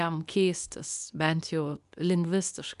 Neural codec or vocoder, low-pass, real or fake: codec, 24 kHz, 0.9 kbps, WavTokenizer, medium speech release version 2; 10.8 kHz; fake